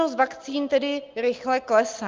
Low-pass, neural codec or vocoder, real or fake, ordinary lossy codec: 7.2 kHz; none; real; Opus, 24 kbps